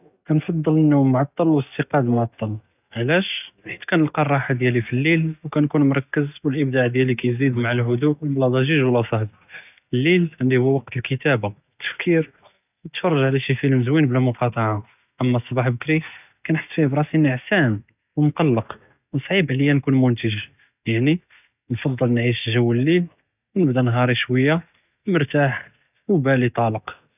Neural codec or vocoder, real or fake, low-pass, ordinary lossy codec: none; real; 3.6 kHz; none